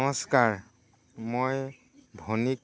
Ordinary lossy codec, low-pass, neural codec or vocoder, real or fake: none; none; none; real